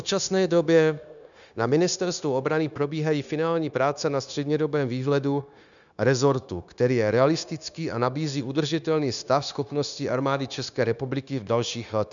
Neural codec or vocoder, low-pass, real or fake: codec, 16 kHz, 0.9 kbps, LongCat-Audio-Codec; 7.2 kHz; fake